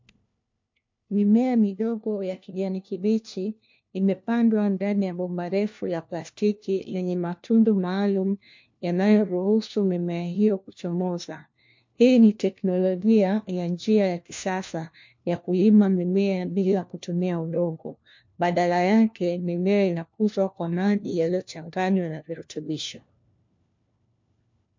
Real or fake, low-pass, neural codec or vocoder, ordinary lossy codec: fake; 7.2 kHz; codec, 16 kHz, 1 kbps, FunCodec, trained on LibriTTS, 50 frames a second; MP3, 48 kbps